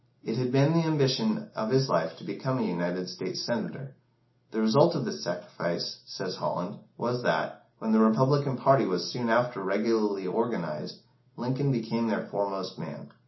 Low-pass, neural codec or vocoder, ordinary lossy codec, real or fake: 7.2 kHz; none; MP3, 24 kbps; real